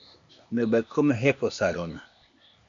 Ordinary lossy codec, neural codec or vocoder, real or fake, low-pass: MP3, 64 kbps; codec, 16 kHz, 0.8 kbps, ZipCodec; fake; 7.2 kHz